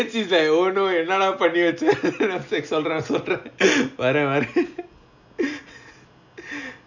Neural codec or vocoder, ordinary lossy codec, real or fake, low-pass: none; none; real; 7.2 kHz